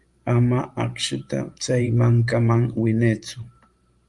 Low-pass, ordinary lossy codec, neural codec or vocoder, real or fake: 10.8 kHz; Opus, 32 kbps; vocoder, 44.1 kHz, 128 mel bands every 512 samples, BigVGAN v2; fake